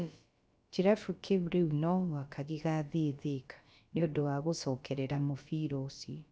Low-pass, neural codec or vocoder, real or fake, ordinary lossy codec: none; codec, 16 kHz, about 1 kbps, DyCAST, with the encoder's durations; fake; none